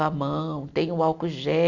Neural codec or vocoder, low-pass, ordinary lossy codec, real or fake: none; 7.2 kHz; none; real